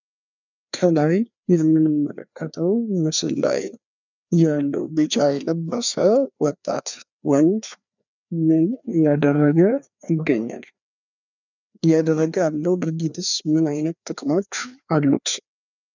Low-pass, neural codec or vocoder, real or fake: 7.2 kHz; codec, 16 kHz, 2 kbps, FreqCodec, larger model; fake